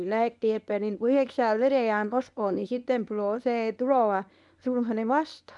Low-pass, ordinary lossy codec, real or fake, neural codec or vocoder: 10.8 kHz; none; fake; codec, 24 kHz, 0.9 kbps, WavTokenizer, medium speech release version 1